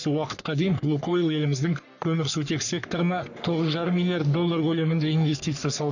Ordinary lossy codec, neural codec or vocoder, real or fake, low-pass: none; codec, 44.1 kHz, 3.4 kbps, Pupu-Codec; fake; 7.2 kHz